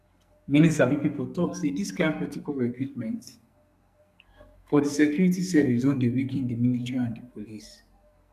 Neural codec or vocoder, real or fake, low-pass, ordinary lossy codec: codec, 44.1 kHz, 2.6 kbps, SNAC; fake; 14.4 kHz; none